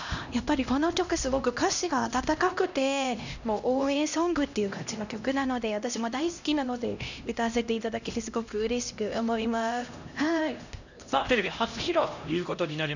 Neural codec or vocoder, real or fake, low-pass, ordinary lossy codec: codec, 16 kHz, 1 kbps, X-Codec, HuBERT features, trained on LibriSpeech; fake; 7.2 kHz; none